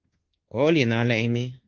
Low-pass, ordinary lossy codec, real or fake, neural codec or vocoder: 7.2 kHz; Opus, 16 kbps; fake; codec, 24 kHz, 1.2 kbps, DualCodec